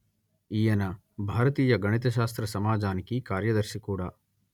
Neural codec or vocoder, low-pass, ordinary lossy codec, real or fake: none; 19.8 kHz; none; real